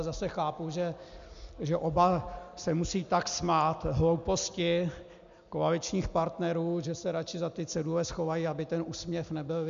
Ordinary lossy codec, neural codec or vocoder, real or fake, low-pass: MP3, 64 kbps; none; real; 7.2 kHz